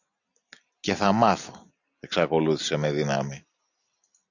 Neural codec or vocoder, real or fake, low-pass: none; real; 7.2 kHz